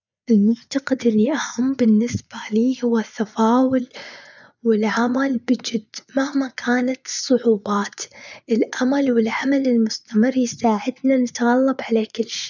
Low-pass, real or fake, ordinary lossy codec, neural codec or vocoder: 7.2 kHz; fake; none; vocoder, 24 kHz, 100 mel bands, Vocos